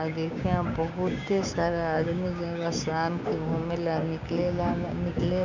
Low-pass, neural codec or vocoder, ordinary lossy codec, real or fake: 7.2 kHz; codec, 16 kHz, 6 kbps, DAC; none; fake